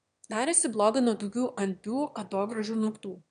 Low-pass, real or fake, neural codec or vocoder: 9.9 kHz; fake; autoencoder, 22.05 kHz, a latent of 192 numbers a frame, VITS, trained on one speaker